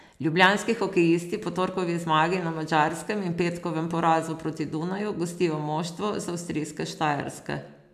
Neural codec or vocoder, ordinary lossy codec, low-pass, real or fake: none; none; 14.4 kHz; real